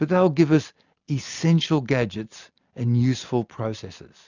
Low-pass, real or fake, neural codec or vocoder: 7.2 kHz; real; none